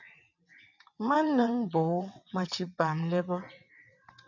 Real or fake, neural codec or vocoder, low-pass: fake; vocoder, 22.05 kHz, 80 mel bands, WaveNeXt; 7.2 kHz